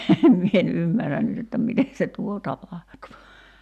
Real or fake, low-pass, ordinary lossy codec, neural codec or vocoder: real; 14.4 kHz; none; none